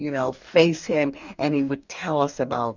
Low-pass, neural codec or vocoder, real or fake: 7.2 kHz; codec, 44.1 kHz, 2.6 kbps, DAC; fake